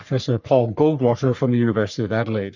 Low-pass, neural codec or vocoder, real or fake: 7.2 kHz; codec, 44.1 kHz, 3.4 kbps, Pupu-Codec; fake